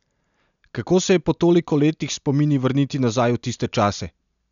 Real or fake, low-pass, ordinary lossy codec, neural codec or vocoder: real; 7.2 kHz; none; none